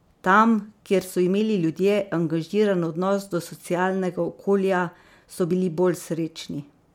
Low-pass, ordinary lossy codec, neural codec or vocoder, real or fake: 19.8 kHz; MP3, 96 kbps; none; real